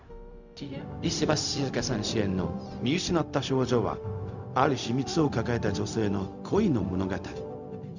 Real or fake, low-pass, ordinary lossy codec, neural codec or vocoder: fake; 7.2 kHz; none; codec, 16 kHz, 0.4 kbps, LongCat-Audio-Codec